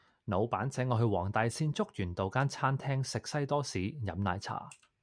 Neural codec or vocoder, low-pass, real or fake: none; 9.9 kHz; real